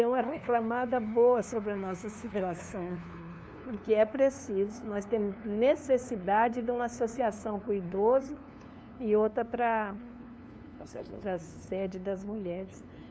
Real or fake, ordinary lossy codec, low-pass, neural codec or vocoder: fake; none; none; codec, 16 kHz, 2 kbps, FunCodec, trained on LibriTTS, 25 frames a second